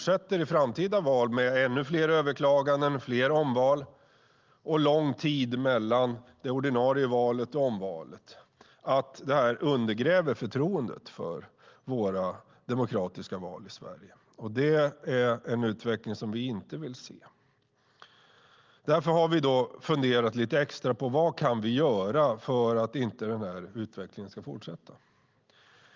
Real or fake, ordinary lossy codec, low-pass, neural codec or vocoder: real; Opus, 32 kbps; 7.2 kHz; none